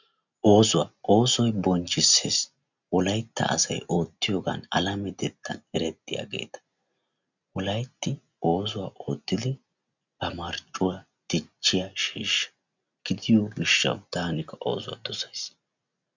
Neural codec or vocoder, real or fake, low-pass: none; real; 7.2 kHz